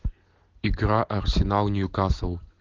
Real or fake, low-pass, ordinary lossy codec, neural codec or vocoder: real; 7.2 kHz; Opus, 16 kbps; none